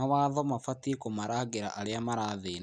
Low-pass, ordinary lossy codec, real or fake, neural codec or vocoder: 9.9 kHz; none; real; none